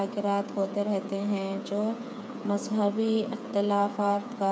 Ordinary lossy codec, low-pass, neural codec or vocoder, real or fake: none; none; codec, 16 kHz, 8 kbps, FreqCodec, smaller model; fake